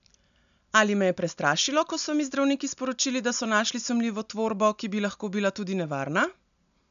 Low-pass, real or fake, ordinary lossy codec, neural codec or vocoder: 7.2 kHz; real; none; none